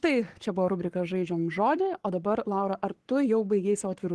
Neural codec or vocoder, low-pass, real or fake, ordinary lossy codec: codec, 44.1 kHz, 7.8 kbps, Pupu-Codec; 10.8 kHz; fake; Opus, 16 kbps